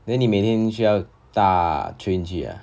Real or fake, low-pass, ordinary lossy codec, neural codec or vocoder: real; none; none; none